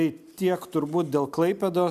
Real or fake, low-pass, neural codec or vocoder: real; 14.4 kHz; none